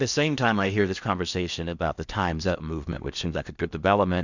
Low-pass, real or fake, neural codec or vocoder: 7.2 kHz; fake; codec, 16 kHz in and 24 kHz out, 0.8 kbps, FocalCodec, streaming, 65536 codes